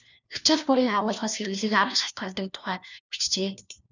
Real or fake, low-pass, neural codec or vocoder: fake; 7.2 kHz; codec, 16 kHz, 1 kbps, FunCodec, trained on LibriTTS, 50 frames a second